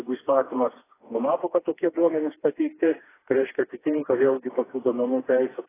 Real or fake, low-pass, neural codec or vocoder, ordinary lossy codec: fake; 3.6 kHz; codec, 16 kHz, 2 kbps, FreqCodec, smaller model; AAC, 16 kbps